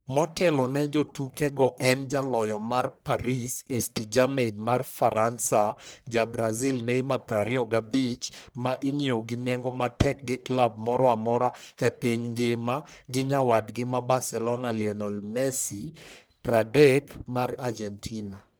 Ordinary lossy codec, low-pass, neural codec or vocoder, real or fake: none; none; codec, 44.1 kHz, 1.7 kbps, Pupu-Codec; fake